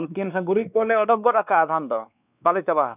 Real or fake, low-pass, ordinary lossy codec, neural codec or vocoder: fake; 3.6 kHz; none; codec, 16 kHz, 2 kbps, X-Codec, WavLM features, trained on Multilingual LibriSpeech